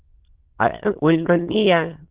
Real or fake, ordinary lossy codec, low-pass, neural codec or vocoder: fake; Opus, 16 kbps; 3.6 kHz; autoencoder, 22.05 kHz, a latent of 192 numbers a frame, VITS, trained on many speakers